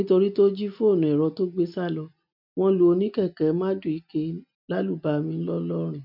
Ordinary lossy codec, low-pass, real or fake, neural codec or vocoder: none; 5.4 kHz; real; none